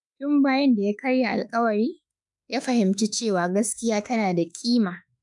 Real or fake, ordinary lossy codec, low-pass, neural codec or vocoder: fake; none; 10.8 kHz; autoencoder, 48 kHz, 32 numbers a frame, DAC-VAE, trained on Japanese speech